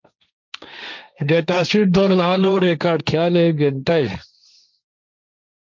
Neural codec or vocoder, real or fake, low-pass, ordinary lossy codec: codec, 16 kHz, 1.1 kbps, Voila-Tokenizer; fake; 7.2 kHz; MP3, 64 kbps